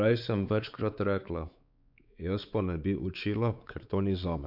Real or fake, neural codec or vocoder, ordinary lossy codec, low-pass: fake; codec, 16 kHz, 4 kbps, X-Codec, WavLM features, trained on Multilingual LibriSpeech; none; 5.4 kHz